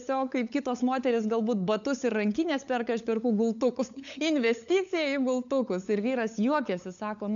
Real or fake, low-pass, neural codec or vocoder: fake; 7.2 kHz; codec, 16 kHz, 8 kbps, FunCodec, trained on LibriTTS, 25 frames a second